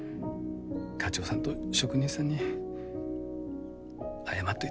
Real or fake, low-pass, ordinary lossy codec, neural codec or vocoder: real; none; none; none